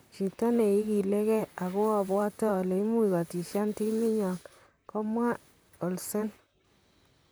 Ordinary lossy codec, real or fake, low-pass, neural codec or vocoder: none; fake; none; vocoder, 44.1 kHz, 128 mel bands, Pupu-Vocoder